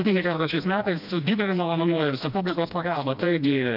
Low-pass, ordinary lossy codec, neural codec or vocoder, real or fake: 5.4 kHz; AAC, 48 kbps; codec, 16 kHz, 1 kbps, FreqCodec, smaller model; fake